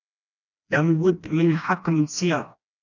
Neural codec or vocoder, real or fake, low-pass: codec, 16 kHz, 1 kbps, FreqCodec, smaller model; fake; 7.2 kHz